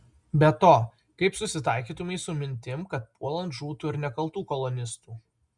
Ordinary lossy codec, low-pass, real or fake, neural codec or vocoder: Opus, 64 kbps; 10.8 kHz; real; none